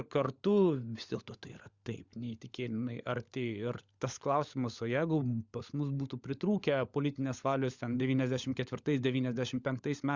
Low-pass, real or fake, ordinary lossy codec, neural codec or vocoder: 7.2 kHz; fake; Opus, 64 kbps; codec, 16 kHz, 16 kbps, FunCodec, trained on LibriTTS, 50 frames a second